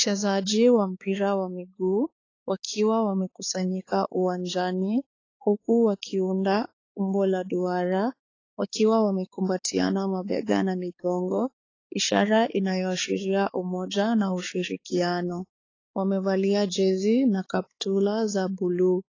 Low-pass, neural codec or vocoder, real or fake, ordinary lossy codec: 7.2 kHz; codec, 16 kHz, 4 kbps, X-Codec, HuBERT features, trained on balanced general audio; fake; AAC, 32 kbps